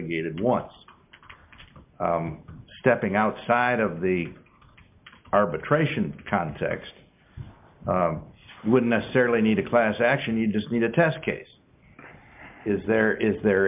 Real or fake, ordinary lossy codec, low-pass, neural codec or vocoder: real; MP3, 32 kbps; 3.6 kHz; none